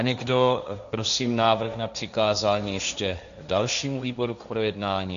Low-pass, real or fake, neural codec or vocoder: 7.2 kHz; fake; codec, 16 kHz, 1.1 kbps, Voila-Tokenizer